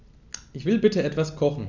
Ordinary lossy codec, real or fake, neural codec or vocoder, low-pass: none; real; none; 7.2 kHz